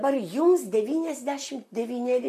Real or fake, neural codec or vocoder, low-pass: fake; vocoder, 44.1 kHz, 128 mel bands, Pupu-Vocoder; 14.4 kHz